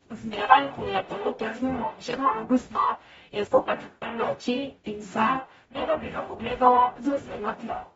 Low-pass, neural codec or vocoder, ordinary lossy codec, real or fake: 19.8 kHz; codec, 44.1 kHz, 0.9 kbps, DAC; AAC, 24 kbps; fake